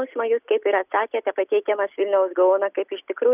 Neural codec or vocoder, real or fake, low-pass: none; real; 3.6 kHz